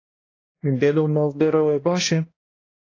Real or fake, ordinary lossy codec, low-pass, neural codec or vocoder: fake; AAC, 32 kbps; 7.2 kHz; codec, 16 kHz, 1 kbps, X-Codec, HuBERT features, trained on balanced general audio